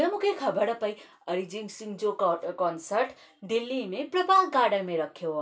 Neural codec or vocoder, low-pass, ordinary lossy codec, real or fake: none; none; none; real